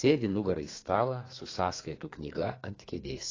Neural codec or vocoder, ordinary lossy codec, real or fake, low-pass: codec, 32 kHz, 1.9 kbps, SNAC; AAC, 32 kbps; fake; 7.2 kHz